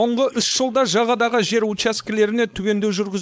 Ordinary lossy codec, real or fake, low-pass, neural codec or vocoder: none; fake; none; codec, 16 kHz, 4.8 kbps, FACodec